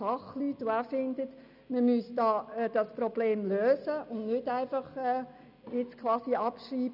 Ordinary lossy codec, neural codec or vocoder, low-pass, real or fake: none; none; 5.4 kHz; real